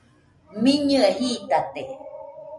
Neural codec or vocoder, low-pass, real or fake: none; 10.8 kHz; real